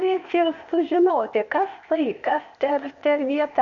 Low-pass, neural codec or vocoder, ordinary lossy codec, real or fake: 7.2 kHz; codec, 16 kHz, 0.8 kbps, ZipCodec; Opus, 64 kbps; fake